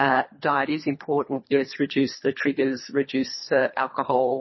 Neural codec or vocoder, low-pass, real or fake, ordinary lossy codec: codec, 24 kHz, 3 kbps, HILCodec; 7.2 kHz; fake; MP3, 24 kbps